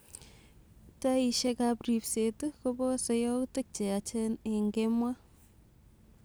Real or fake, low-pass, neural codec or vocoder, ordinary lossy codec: real; none; none; none